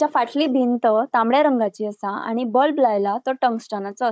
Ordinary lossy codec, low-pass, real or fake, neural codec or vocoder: none; none; fake; codec, 16 kHz, 16 kbps, FunCodec, trained on Chinese and English, 50 frames a second